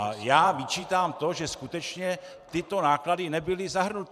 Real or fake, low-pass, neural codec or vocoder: fake; 14.4 kHz; vocoder, 44.1 kHz, 128 mel bands every 512 samples, BigVGAN v2